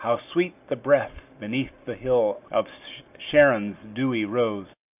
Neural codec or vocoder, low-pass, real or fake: none; 3.6 kHz; real